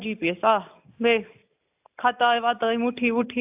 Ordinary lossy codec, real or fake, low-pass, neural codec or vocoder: AAC, 32 kbps; real; 3.6 kHz; none